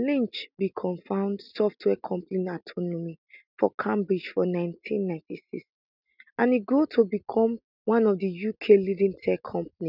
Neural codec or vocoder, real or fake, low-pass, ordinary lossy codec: none; real; 5.4 kHz; none